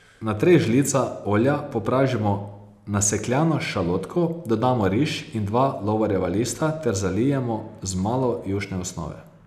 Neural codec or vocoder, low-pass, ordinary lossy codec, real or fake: none; 14.4 kHz; none; real